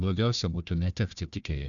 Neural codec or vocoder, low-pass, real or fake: codec, 16 kHz, 1 kbps, FunCodec, trained on Chinese and English, 50 frames a second; 7.2 kHz; fake